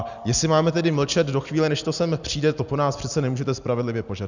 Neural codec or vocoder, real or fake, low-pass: none; real; 7.2 kHz